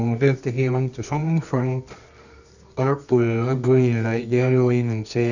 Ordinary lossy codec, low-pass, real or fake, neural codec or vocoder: none; 7.2 kHz; fake; codec, 24 kHz, 0.9 kbps, WavTokenizer, medium music audio release